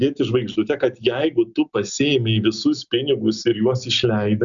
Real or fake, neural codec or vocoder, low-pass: real; none; 7.2 kHz